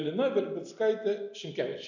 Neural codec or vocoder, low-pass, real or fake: none; 7.2 kHz; real